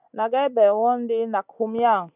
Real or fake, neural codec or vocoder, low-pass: real; none; 3.6 kHz